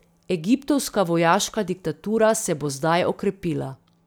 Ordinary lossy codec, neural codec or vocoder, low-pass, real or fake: none; none; none; real